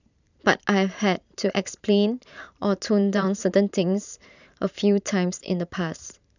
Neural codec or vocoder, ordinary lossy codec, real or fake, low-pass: vocoder, 44.1 kHz, 128 mel bands every 512 samples, BigVGAN v2; none; fake; 7.2 kHz